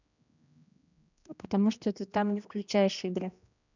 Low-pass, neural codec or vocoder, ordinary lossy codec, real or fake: 7.2 kHz; codec, 16 kHz, 1 kbps, X-Codec, HuBERT features, trained on general audio; none; fake